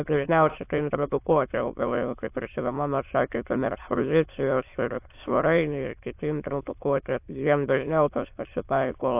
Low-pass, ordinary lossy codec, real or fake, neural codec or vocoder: 3.6 kHz; AAC, 32 kbps; fake; autoencoder, 22.05 kHz, a latent of 192 numbers a frame, VITS, trained on many speakers